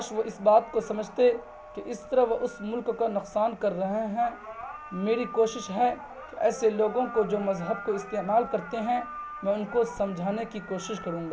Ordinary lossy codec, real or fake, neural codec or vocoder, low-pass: none; real; none; none